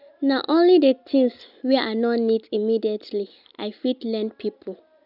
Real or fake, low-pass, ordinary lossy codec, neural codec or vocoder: real; 5.4 kHz; none; none